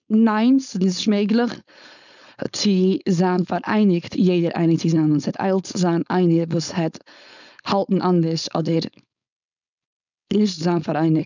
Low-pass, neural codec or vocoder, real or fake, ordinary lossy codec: 7.2 kHz; codec, 16 kHz, 4.8 kbps, FACodec; fake; none